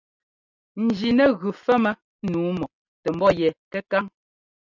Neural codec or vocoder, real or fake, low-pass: none; real; 7.2 kHz